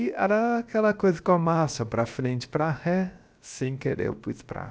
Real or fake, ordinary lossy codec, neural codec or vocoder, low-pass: fake; none; codec, 16 kHz, about 1 kbps, DyCAST, with the encoder's durations; none